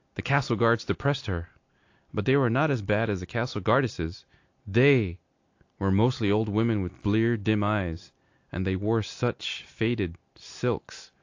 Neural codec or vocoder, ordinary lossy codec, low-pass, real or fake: none; AAC, 48 kbps; 7.2 kHz; real